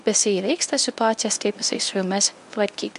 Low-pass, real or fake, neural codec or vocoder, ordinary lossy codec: 10.8 kHz; fake; codec, 24 kHz, 0.9 kbps, WavTokenizer, medium speech release version 1; MP3, 64 kbps